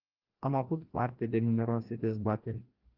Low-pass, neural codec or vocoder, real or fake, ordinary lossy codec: 5.4 kHz; codec, 16 kHz, 1 kbps, FreqCodec, larger model; fake; Opus, 16 kbps